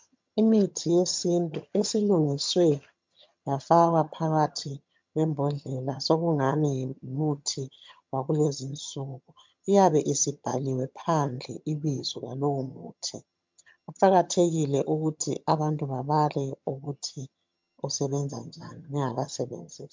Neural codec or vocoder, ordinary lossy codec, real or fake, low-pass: vocoder, 22.05 kHz, 80 mel bands, HiFi-GAN; MP3, 64 kbps; fake; 7.2 kHz